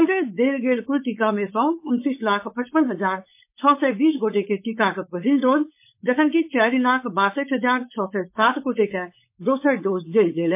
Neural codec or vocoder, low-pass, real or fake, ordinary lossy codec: codec, 16 kHz, 4.8 kbps, FACodec; 3.6 kHz; fake; MP3, 24 kbps